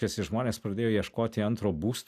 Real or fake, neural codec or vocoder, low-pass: real; none; 14.4 kHz